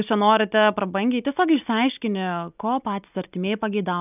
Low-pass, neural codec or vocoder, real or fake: 3.6 kHz; none; real